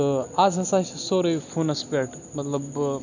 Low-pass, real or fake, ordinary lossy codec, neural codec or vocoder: 7.2 kHz; real; none; none